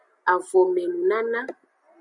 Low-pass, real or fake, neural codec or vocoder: 10.8 kHz; real; none